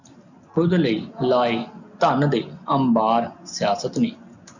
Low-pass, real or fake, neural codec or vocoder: 7.2 kHz; real; none